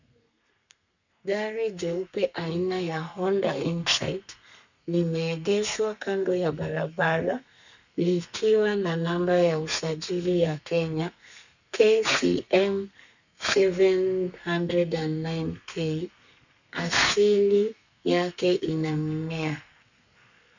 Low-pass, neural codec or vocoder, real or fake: 7.2 kHz; codec, 32 kHz, 1.9 kbps, SNAC; fake